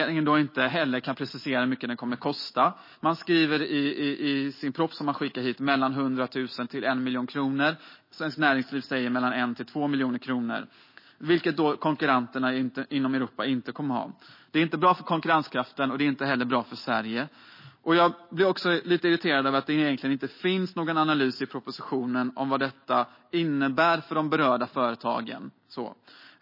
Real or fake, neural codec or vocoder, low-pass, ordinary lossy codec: real; none; 5.4 kHz; MP3, 24 kbps